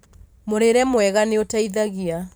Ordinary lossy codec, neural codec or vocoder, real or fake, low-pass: none; vocoder, 44.1 kHz, 128 mel bands every 512 samples, BigVGAN v2; fake; none